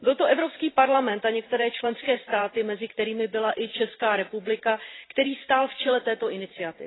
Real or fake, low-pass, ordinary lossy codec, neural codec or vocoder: fake; 7.2 kHz; AAC, 16 kbps; vocoder, 44.1 kHz, 128 mel bands every 512 samples, BigVGAN v2